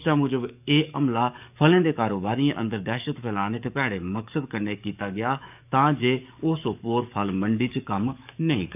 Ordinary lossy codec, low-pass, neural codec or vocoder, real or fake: none; 3.6 kHz; codec, 44.1 kHz, 7.8 kbps, DAC; fake